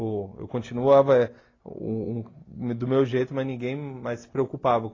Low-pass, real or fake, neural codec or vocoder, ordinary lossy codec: 7.2 kHz; real; none; AAC, 32 kbps